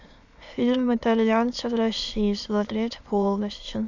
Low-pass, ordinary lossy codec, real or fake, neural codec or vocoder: 7.2 kHz; none; fake; autoencoder, 22.05 kHz, a latent of 192 numbers a frame, VITS, trained on many speakers